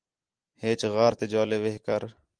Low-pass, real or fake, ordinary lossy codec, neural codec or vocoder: 9.9 kHz; real; Opus, 24 kbps; none